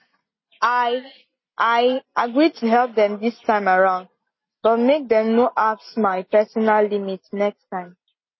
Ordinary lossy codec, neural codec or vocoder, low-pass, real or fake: MP3, 24 kbps; none; 7.2 kHz; real